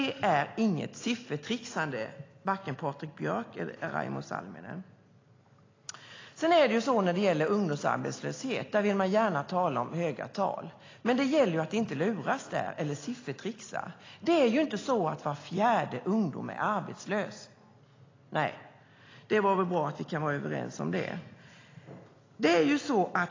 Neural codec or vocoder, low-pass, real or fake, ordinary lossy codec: none; 7.2 kHz; real; AAC, 32 kbps